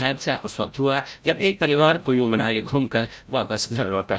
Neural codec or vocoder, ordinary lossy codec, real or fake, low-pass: codec, 16 kHz, 0.5 kbps, FreqCodec, larger model; none; fake; none